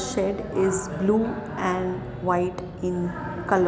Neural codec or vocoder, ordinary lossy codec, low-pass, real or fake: none; none; none; real